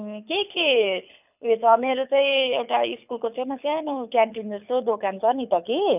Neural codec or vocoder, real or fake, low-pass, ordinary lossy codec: codec, 24 kHz, 6 kbps, HILCodec; fake; 3.6 kHz; none